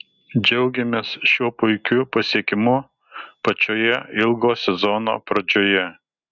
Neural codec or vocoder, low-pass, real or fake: none; 7.2 kHz; real